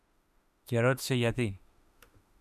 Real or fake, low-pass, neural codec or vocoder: fake; 14.4 kHz; autoencoder, 48 kHz, 32 numbers a frame, DAC-VAE, trained on Japanese speech